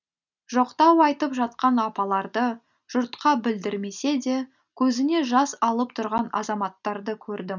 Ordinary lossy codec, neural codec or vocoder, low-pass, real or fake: none; none; 7.2 kHz; real